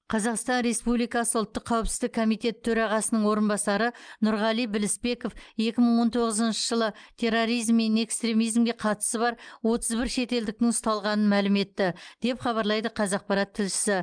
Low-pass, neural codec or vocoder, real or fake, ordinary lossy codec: 9.9 kHz; none; real; Opus, 32 kbps